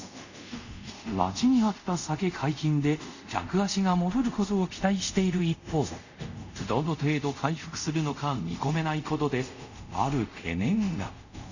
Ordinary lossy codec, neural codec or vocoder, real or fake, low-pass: none; codec, 24 kHz, 0.5 kbps, DualCodec; fake; 7.2 kHz